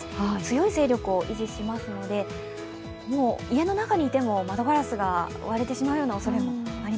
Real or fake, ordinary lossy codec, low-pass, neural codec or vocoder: real; none; none; none